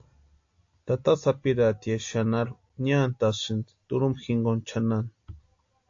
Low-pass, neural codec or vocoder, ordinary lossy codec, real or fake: 7.2 kHz; none; AAC, 48 kbps; real